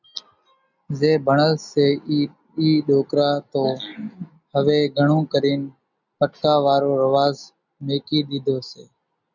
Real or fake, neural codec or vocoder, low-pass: real; none; 7.2 kHz